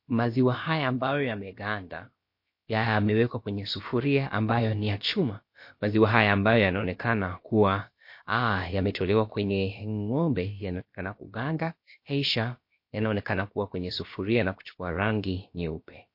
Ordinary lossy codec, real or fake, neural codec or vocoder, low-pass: MP3, 32 kbps; fake; codec, 16 kHz, about 1 kbps, DyCAST, with the encoder's durations; 5.4 kHz